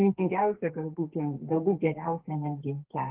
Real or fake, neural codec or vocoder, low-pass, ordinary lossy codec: fake; codec, 44.1 kHz, 2.6 kbps, SNAC; 3.6 kHz; Opus, 16 kbps